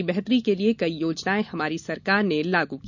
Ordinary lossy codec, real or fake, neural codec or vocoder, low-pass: none; real; none; 7.2 kHz